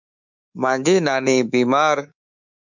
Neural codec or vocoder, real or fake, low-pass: codec, 24 kHz, 3.1 kbps, DualCodec; fake; 7.2 kHz